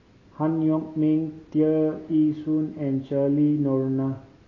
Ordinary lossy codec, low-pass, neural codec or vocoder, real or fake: AAC, 32 kbps; 7.2 kHz; none; real